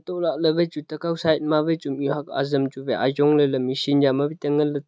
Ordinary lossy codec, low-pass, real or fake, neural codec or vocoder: none; none; real; none